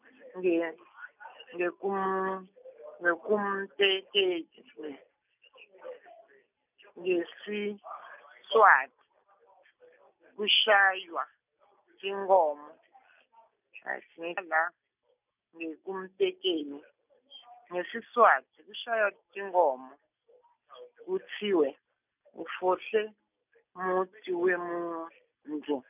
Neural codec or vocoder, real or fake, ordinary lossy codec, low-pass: none; real; none; 3.6 kHz